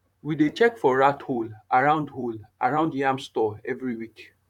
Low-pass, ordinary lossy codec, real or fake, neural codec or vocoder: 19.8 kHz; none; fake; vocoder, 44.1 kHz, 128 mel bands, Pupu-Vocoder